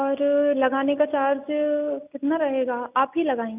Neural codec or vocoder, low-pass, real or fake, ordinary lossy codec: none; 3.6 kHz; real; none